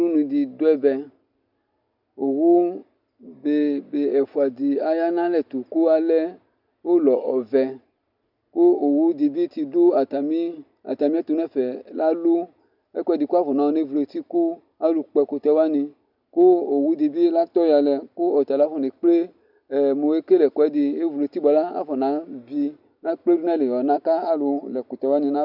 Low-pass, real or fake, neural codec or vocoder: 5.4 kHz; real; none